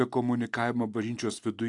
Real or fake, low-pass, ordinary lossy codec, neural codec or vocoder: real; 10.8 kHz; AAC, 64 kbps; none